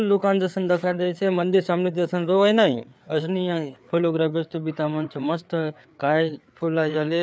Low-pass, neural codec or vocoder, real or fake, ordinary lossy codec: none; codec, 16 kHz, 4 kbps, FreqCodec, larger model; fake; none